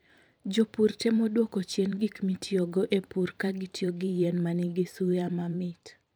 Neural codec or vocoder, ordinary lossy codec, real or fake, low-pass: vocoder, 44.1 kHz, 128 mel bands every 512 samples, BigVGAN v2; none; fake; none